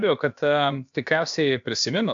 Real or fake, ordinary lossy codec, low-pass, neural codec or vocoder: fake; AAC, 64 kbps; 7.2 kHz; codec, 16 kHz, about 1 kbps, DyCAST, with the encoder's durations